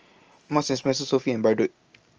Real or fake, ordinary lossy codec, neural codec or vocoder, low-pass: real; Opus, 24 kbps; none; 7.2 kHz